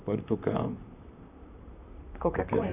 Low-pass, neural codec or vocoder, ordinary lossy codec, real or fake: 3.6 kHz; vocoder, 44.1 kHz, 128 mel bands, Pupu-Vocoder; none; fake